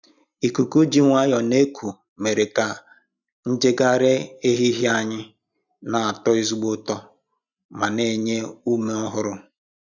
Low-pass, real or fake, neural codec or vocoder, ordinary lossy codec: 7.2 kHz; real; none; none